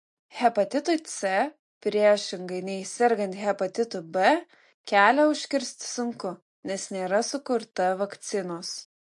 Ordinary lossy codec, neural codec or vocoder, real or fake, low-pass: MP3, 48 kbps; none; real; 10.8 kHz